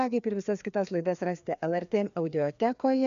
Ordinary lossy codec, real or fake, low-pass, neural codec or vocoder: MP3, 64 kbps; fake; 7.2 kHz; codec, 16 kHz, 2 kbps, FreqCodec, larger model